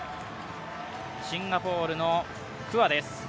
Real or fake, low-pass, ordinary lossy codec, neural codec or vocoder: real; none; none; none